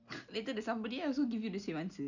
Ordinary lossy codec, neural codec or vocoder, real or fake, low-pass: none; none; real; 7.2 kHz